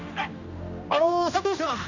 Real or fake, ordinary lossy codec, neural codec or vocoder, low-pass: fake; none; codec, 16 kHz, 1 kbps, X-Codec, HuBERT features, trained on general audio; 7.2 kHz